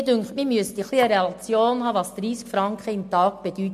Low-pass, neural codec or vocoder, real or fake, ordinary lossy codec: 14.4 kHz; none; real; MP3, 64 kbps